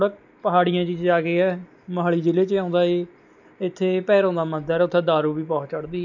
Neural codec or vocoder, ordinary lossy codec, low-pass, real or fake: none; none; 7.2 kHz; real